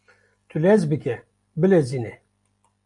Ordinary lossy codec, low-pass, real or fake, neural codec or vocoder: AAC, 64 kbps; 10.8 kHz; real; none